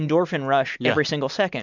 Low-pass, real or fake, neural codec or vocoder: 7.2 kHz; real; none